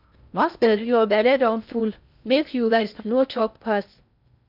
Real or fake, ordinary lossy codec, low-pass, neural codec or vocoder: fake; none; 5.4 kHz; codec, 16 kHz in and 24 kHz out, 0.6 kbps, FocalCodec, streaming, 2048 codes